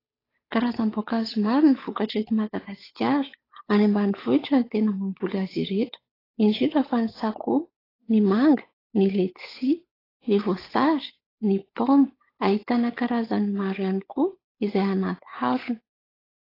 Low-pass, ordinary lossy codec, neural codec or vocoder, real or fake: 5.4 kHz; AAC, 24 kbps; codec, 16 kHz, 8 kbps, FunCodec, trained on Chinese and English, 25 frames a second; fake